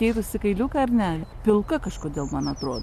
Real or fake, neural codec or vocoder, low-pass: real; none; 14.4 kHz